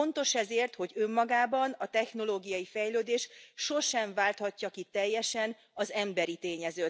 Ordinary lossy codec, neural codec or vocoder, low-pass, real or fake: none; none; none; real